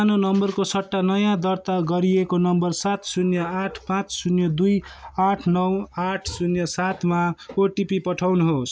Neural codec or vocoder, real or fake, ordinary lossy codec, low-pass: none; real; none; none